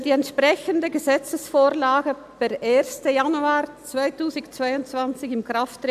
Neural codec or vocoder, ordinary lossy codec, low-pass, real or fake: none; AAC, 96 kbps; 14.4 kHz; real